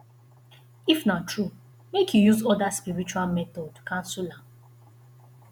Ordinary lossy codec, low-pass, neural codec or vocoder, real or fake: none; none; vocoder, 48 kHz, 128 mel bands, Vocos; fake